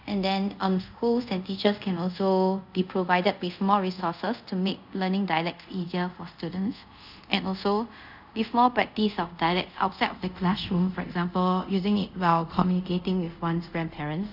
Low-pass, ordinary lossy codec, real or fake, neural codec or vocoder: 5.4 kHz; none; fake; codec, 24 kHz, 0.5 kbps, DualCodec